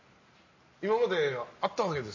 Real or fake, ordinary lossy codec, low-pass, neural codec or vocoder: real; MP3, 32 kbps; 7.2 kHz; none